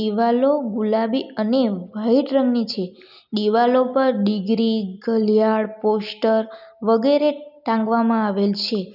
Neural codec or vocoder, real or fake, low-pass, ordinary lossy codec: none; real; 5.4 kHz; none